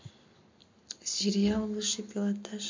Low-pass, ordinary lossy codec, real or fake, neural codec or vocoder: 7.2 kHz; MP3, 48 kbps; real; none